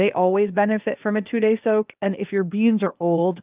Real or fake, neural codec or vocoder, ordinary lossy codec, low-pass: fake; codec, 16 kHz, 0.8 kbps, ZipCodec; Opus, 24 kbps; 3.6 kHz